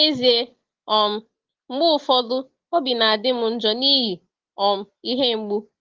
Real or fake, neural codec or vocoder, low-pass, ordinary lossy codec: real; none; 7.2 kHz; Opus, 16 kbps